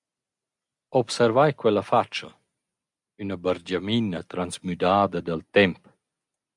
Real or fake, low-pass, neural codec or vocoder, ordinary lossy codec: real; 10.8 kHz; none; MP3, 96 kbps